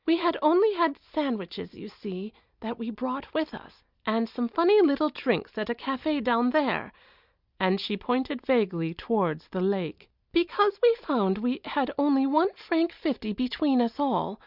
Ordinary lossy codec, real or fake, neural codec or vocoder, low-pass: Opus, 64 kbps; real; none; 5.4 kHz